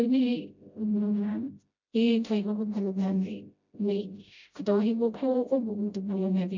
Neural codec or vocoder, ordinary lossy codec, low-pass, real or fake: codec, 16 kHz, 0.5 kbps, FreqCodec, smaller model; MP3, 64 kbps; 7.2 kHz; fake